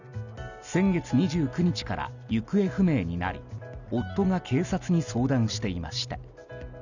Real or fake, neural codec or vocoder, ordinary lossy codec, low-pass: real; none; none; 7.2 kHz